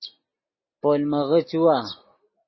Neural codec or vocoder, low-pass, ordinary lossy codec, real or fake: none; 7.2 kHz; MP3, 24 kbps; real